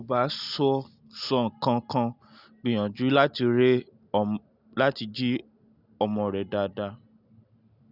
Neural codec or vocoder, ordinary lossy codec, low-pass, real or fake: none; none; 5.4 kHz; real